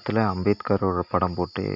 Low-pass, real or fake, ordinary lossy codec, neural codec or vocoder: 5.4 kHz; real; none; none